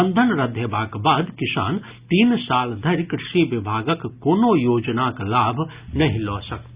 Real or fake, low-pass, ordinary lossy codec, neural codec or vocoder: real; 3.6 kHz; Opus, 64 kbps; none